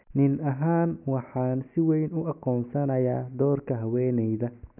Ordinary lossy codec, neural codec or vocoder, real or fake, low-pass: none; none; real; 3.6 kHz